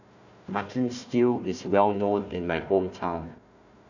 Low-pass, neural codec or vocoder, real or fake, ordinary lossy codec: 7.2 kHz; codec, 16 kHz, 1 kbps, FunCodec, trained on Chinese and English, 50 frames a second; fake; none